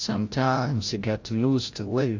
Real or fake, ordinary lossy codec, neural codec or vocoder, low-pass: fake; AAC, 48 kbps; codec, 16 kHz, 0.5 kbps, FreqCodec, larger model; 7.2 kHz